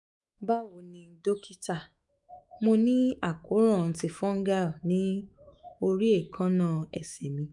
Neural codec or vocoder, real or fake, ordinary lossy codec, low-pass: autoencoder, 48 kHz, 128 numbers a frame, DAC-VAE, trained on Japanese speech; fake; none; 10.8 kHz